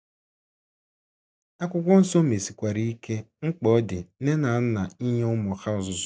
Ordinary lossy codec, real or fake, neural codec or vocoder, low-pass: none; real; none; none